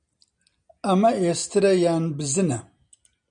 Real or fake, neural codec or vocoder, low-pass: real; none; 9.9 kHz